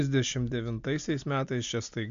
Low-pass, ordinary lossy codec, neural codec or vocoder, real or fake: 7.2 kHz; MP3, 64 kbps; none; real